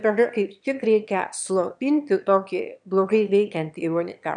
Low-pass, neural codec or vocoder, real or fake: 9.9 kHz; autoencoder, 22.05 kHz, a latent of 192 numbers a frame, VITS, trained on one speaker; fake